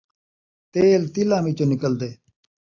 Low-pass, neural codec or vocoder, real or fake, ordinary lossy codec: 7.2 kHz; none; real; AAC, 48 kbps